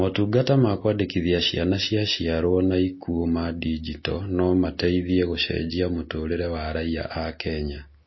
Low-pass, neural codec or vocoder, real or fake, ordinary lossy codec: 7.2 kHz; none; real; MP3, 24 kbps